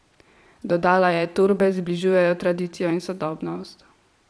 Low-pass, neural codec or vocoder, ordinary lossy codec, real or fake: none; vocoder, 22.05 kHz, 80 mel bands, Vocos; none; fake